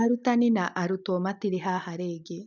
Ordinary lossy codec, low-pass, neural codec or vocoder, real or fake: none; 7.2 kHz; none; real